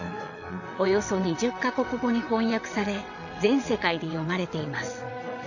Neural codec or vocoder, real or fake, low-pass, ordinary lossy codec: vocoder, 22.05 kHz, 80 mel bands, WaveNeXt; fake; 7.2 kHz; none